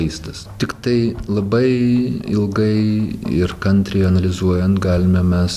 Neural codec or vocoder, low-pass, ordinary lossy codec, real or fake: none; 14.4 kHz; Opus, 64 kbps; real